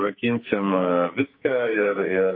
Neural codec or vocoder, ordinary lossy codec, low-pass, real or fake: codec, 44.1 kHz, 2.6 kbps, SNAC; MP3, 32 kbps; 5.4 kHz; fake